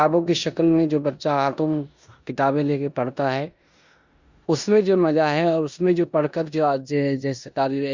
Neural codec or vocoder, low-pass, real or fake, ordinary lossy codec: codec, 16 kHz in and 24 kHz out, 0.9 kbps, LongCat-Audio-Codec, four codebook decoder; 7.2 kHz; fake; Opus, 64 kbps